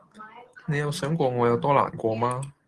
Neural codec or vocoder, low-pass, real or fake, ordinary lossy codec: none; 10.8 kHz; real; Opus, 16 kbps